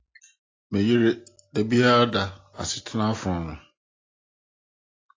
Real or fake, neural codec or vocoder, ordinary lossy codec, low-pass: real; none; AAC, 32 kbps; 7.2 kHz